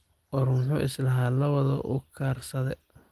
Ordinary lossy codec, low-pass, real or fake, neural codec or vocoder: Opus, 24 kbps; 14.4 kHz; real; none